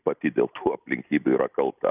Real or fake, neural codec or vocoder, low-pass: real; none; 3.6 kHz